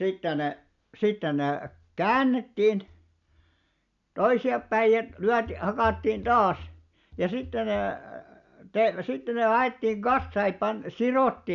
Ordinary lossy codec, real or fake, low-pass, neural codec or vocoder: none; real; 7.2 kHz; none